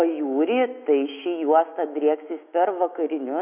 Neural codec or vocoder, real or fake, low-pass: autoencoder, 48 kHz, 128 numbers a frame, DAC-VAE, trained on Japanese speech; fake; 3.6 kHz